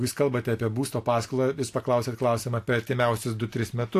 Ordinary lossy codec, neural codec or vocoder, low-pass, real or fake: AAC, 64 kbps; none; 14.4 kHz; real